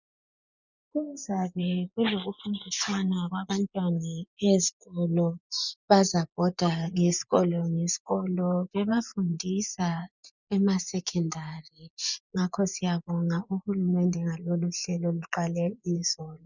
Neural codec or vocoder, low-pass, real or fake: vocoder, 24 kHz, 100 mel bands, Vocos; 7.2 kHz; fake